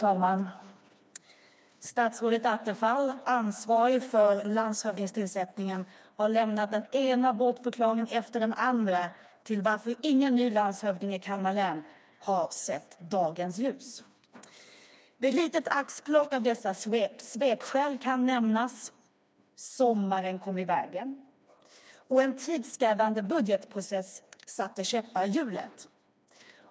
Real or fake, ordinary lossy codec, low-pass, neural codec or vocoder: fake; none; none; codec, 16 kHz, 2 kbps, FreqCodec, smaller model